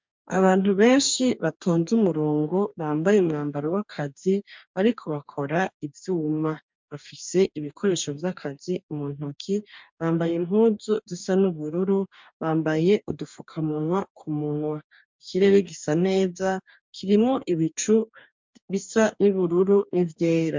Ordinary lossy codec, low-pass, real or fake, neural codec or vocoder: MP3, 64 kbps; 7.2 kHz; fake; codec, 44.1 kHz, 2.6 kbps, DAC